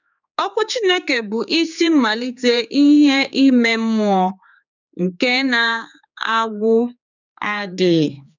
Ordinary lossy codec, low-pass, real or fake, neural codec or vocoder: none; 7.2 kHz; fake; codec, 16 kHz, 4 kbps, X-Codec, HuBERT features, trained on general audio